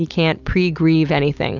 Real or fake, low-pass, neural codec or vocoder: real; 7.2 kHz; none